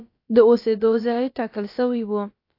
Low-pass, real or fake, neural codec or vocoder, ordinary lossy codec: 5.4 kHz; fake; codec, 16 kHz, about 1 kbps, DyCAST, with the encoder's durations; AAC, 32 kbps